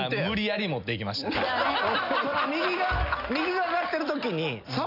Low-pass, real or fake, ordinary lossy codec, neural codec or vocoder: 5.4 kHz; real; none; none